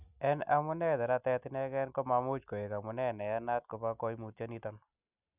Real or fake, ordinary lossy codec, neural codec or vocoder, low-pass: real; none; none; 3.6 kHz